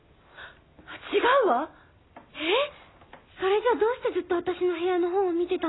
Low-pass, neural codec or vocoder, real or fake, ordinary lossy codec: 7.2 kHz; none; real; AAC, 16 kbps